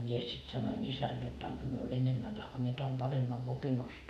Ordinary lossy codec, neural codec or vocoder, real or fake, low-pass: none; autoencoder, 48 kHz, 32 numbers a frame, DAC-VAE, trained on Japanese speech; fake; 14.4 kHz